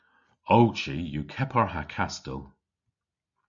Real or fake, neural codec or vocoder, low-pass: real; none; 7.2 kHz